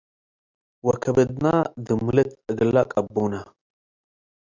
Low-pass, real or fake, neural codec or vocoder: 7.2 kHz; real; none